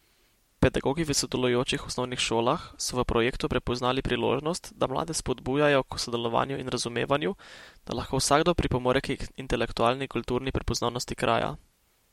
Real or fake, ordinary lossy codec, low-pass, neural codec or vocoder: real; MP3, 64 kbps; 19.8 kHz; none